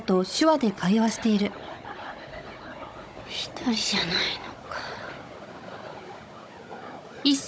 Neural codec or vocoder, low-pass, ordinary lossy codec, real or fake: codec, 16 kHz, 16 kbps, FunCodec, trained on Chinese and English, 50 frames a second; none; none; fake